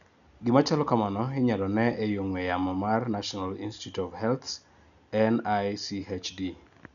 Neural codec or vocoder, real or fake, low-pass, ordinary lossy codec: none; real; 7.2 kHz; none